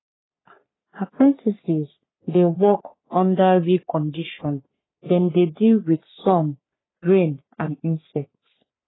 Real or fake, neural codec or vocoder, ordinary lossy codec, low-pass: fake; codec, 44.1 kHz, 3.4 kbps, Pupu-Codec; AAC, 16 kbps; 7.2 kHz